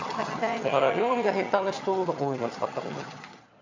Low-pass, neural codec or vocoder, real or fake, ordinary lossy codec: 7.2 kHz; vocoder, 22.05 kHz, 80 mel bands, HiFi-GAN; fake; MP3, 64 kbps